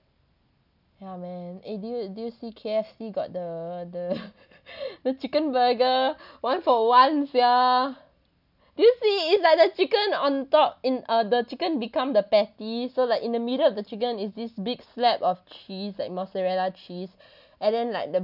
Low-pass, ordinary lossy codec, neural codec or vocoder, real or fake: 5.4 kHz; none; none; real